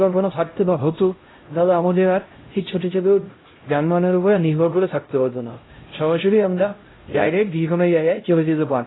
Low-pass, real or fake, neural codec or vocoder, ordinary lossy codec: 7.2 kHz; fake; codec, 16 kHz, 0.5 kbps, X-Codec, HuBERT features, trained on LibriSpeech; AAC, 16 kbps